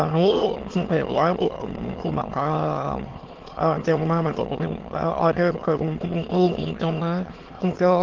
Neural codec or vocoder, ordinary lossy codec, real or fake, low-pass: autoencoder, 22.05 kHz, a latent of 192 numbers a frame, VITS, trained on many speakers; Opus, 16 kbps; fake; 7.2 kHz